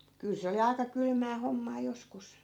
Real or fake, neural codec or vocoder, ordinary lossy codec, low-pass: fake; vocoder, 44.1 kHz, 128 mel bands every 256 samples, BigVGAN v2; none; 19.8 kHz